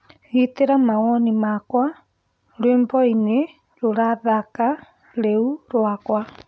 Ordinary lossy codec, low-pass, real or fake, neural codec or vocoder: none; none; real; none